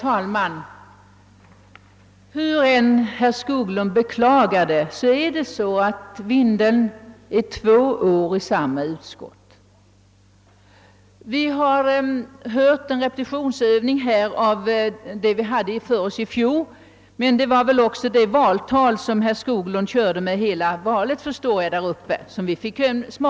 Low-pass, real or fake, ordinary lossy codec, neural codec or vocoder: none; real; none; none